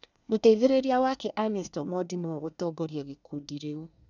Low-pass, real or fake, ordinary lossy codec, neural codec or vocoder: 7.2 kHz; fake; none; codec, 24 kHz, 1 kbps, SNAC